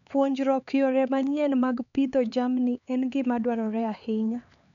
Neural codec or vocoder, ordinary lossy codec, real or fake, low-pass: codec, 16 kHz, 4 kbps, X-Codec, HuBERT features, trained on LibriSpeech; none; fake; 7.2 kHz